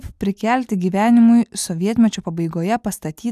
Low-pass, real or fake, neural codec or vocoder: 14.4 kHz; real; none